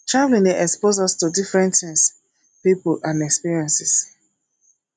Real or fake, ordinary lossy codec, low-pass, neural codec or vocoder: real; none; 9.9 kHz; none